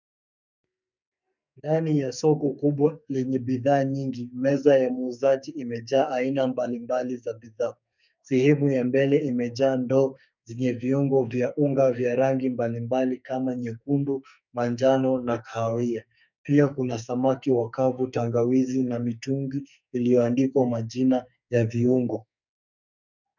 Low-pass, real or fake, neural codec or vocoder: 7.2 kHz; fake; codec, 44.1 kHz, 2.6 kbps, SNAC